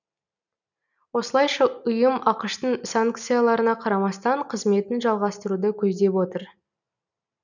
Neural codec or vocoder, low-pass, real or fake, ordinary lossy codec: none; 7.2 kHz; real; none